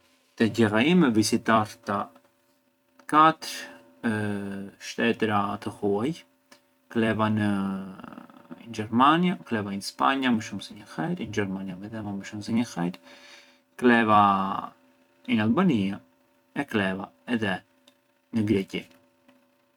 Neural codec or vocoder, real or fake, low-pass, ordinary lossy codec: vocoder, 44.1 kHz, 128 mel bands every 256 samples, BigVGAN v2; fake; 19.8 kHz; none